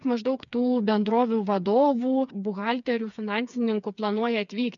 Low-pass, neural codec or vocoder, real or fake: 7.2 kHz; codec, 16 kHz, 4 kbps, FreqCodec, smaller model; fake